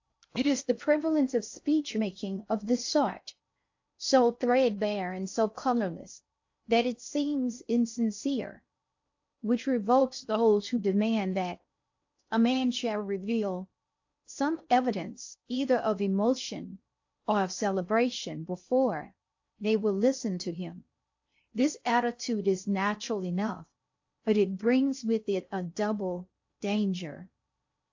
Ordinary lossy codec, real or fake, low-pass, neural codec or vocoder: AAC, 48 kbps; fake; 7.2 kHz; codec, 16 kHz in and 24 kHz out, 0.6 kbps, FocalCodec, streaming, 4096 codes